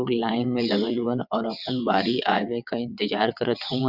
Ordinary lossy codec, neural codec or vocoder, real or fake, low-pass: Opus, 64 kbps; vocoder, 22.05 kHz, 80 mel bands, WaveNeXt; fake; 5.4 kHz